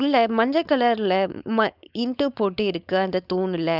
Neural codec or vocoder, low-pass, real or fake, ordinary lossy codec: codec, 16 kHz, 4.8 kbps, FACodec; 5.4 kHz; fake; none